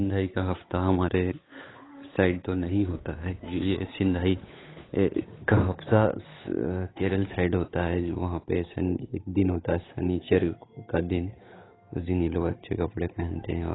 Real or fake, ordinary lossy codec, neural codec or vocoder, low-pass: fake; AAC, 16 kbps; codec, 16 kHz, 8 kbps, FunCodec, trained on Chinese and English, 25 frames a second; 7.2 kHz